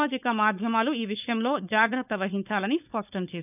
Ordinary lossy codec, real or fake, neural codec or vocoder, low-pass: none; fake; codec, 16 kHz, 4.8 kbps, FACodec; 3.6 kHz